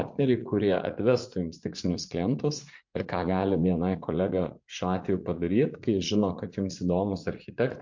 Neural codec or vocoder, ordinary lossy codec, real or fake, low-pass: vocoder, 44.1 kHz, 80 mel bands, Vocos; MP3, 48 kbps; fake; 7.2 kHz